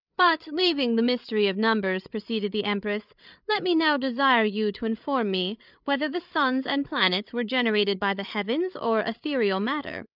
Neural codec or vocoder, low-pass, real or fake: codec, 16 kHz, 8 kbps, FreqCodec, larger model; 5.4 kHz; fake